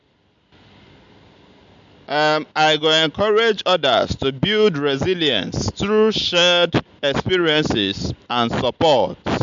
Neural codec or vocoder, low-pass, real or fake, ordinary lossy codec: none; 7.2 kHz; real; MP3, 64 kbps